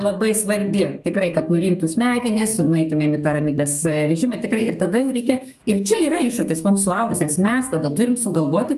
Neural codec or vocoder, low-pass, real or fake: codec, 32 kHz, 1.9 kbps, SNAC; 14.4 kHz; fake